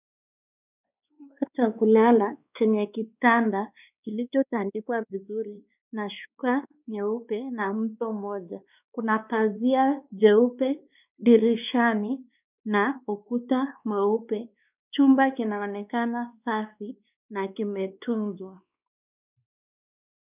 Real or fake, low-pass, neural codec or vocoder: fake; 3.6 kHz; codec, 16 kHz, 2 kbps, X-Codec, WavLM features, trained on Multilingual LibriSpeech